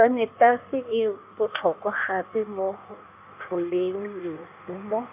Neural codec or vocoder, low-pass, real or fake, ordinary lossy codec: codec, 16 kHz in and 24 kHz out, 1.1 kbps, FireRedTTS-2 codec; 3.6 kHz; fake; AAC, 32 kbps